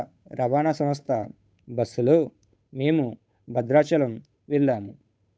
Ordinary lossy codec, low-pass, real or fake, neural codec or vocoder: none; none; fake; codec, 16 kHz, 8 kbps, FunCodec, trained on Chinese and English, 25 frames a second